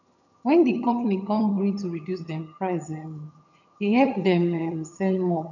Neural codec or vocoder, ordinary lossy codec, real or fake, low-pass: vocoder, 22.05 kHz, 80 mel bands, HiFi-GAN; none; fake; 7.2 kHz